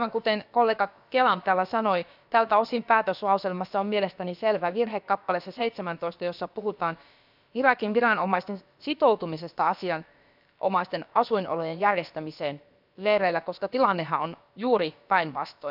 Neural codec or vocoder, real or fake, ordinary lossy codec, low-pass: codec, 16 kHz, about 1 kbps, DyCAST, with the encoder's durations; fake; none; 5.4 kHz